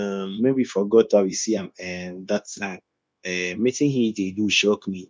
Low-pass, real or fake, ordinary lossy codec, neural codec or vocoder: none; fake; none; codec, 16 kHz, 0.9 kbps, LongCat-Audio-Codec